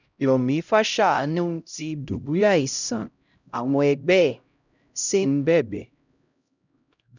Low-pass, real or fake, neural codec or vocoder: 7.2 kHz; fake; codec, 16 kHz, 0.5 kbps, X-Codec, HuBERT features, trained on LibriSpeech